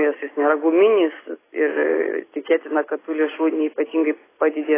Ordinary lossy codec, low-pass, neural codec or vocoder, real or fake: AAC, 16 kbps; 3.6 kHz; none; real